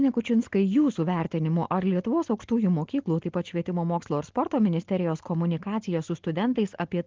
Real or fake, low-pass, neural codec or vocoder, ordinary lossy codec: real; 7.2 kHz; none; Opus, 16 kbps